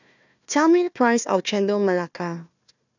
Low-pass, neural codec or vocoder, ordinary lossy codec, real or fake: 7.2 kHz; codec, 16 kHz, 1 kbps, FunCodec, trained on Chinese and English, 50 frames a second; none; fake